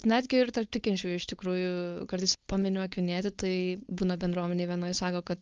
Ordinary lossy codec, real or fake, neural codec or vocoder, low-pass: Opus, 32 kbps; fake; codec, 16 kHz, 4.8 kbps, FACodec; 7.2 kHz